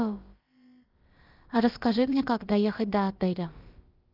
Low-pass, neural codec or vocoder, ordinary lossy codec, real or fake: 5.4 kHz; codec, 16 kHz, about 1 kbps, DyCAST, with the encoder's durations; Opus, 32 kbps; fake